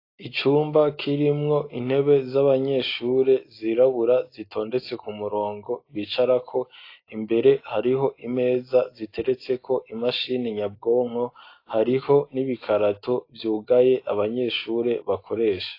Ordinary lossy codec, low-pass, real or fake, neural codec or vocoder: AAC, 32 kbps; 5.4 kHz; real; none